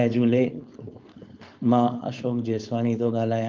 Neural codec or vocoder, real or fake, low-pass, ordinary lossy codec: codec, 16 kHz, 4.8 kbps, FACodec; fake; 7.2 kHz; Opus, 32 kbps